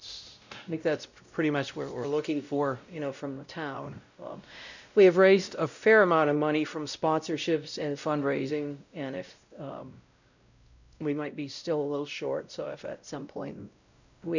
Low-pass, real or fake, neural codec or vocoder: 7.2 kHz; fake; codec, 16 kHz, 0.5 kbps, X-Codec, WavLM features, trained on Multilingual LibriSpeech